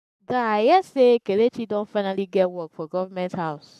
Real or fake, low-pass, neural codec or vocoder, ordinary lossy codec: fake; 14.4 kHz; codec, 44.1 kHz, 7.8 kbps, Pupu-Codec; none